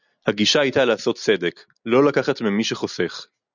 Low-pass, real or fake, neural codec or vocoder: 7.2 kHz; real; none